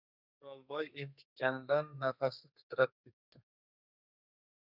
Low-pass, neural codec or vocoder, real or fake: 5.4 kHz; codec, 32 kHz, 1.9 kbps, SNAC; fake